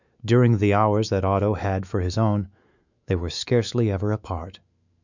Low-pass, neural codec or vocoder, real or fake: 7.2 kHz; autoencoder, 48 kHz, 128 numbers a frame, DAC-VAE, trained on Japanese speech; fake